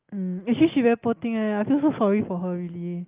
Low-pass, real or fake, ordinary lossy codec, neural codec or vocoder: 3.6 kHz; real; Opus, 24 kbps; none